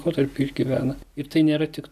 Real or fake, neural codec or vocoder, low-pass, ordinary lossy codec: real; none; 14.4 kHz; AAC, 96 kbps